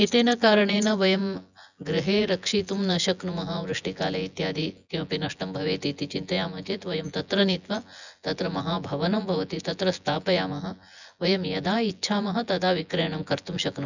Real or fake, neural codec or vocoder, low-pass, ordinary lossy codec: fake; vocoder, 24 kHz, 100 mel bands, Vocos; 7.2 kHz; none